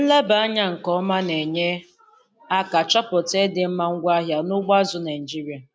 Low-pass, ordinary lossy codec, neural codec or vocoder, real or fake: none; none; none; real